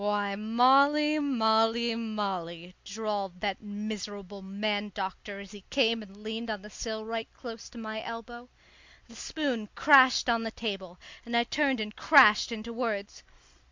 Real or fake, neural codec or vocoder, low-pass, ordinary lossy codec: real; none; 7.2 kHz; MP3, 64 kbps